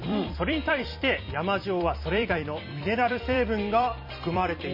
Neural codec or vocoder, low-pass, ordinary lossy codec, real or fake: vocoder, 44.1 kHz, 128 mel bands every 256 samples, BigVGAN v2; 5.4 kHz; MP3, 24 kbps; fake